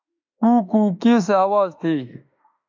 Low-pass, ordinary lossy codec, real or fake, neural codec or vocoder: 7.2 kHz; MP3, 64 kbps; fake; autoencoder, 48 kHz, 32 numbers a frame, DAC-VAE, trained on Japanese speech